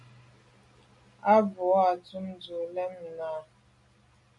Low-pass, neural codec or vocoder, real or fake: 10.8 kHz; none; real